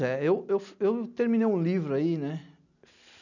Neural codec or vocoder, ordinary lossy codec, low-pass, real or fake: none; none; 7.2 kHz; real